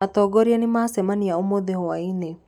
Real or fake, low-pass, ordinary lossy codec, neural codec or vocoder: real; 19.8 kHz; none; none